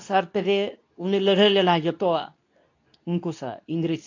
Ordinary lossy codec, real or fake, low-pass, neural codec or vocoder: AAC, 48 kbps; fake; 7.2 kHz; codec, 24 kHz, 0.9 kbps, WavTokenizer, medium speech release version 2